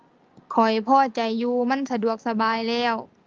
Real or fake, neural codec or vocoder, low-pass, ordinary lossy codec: real; none; 7.2 kHz; Opus, 24 kbps